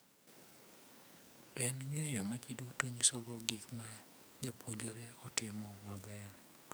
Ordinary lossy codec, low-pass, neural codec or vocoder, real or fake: none; none; codec, 44.1 kHz, 2.6 kbps, SNAC; fake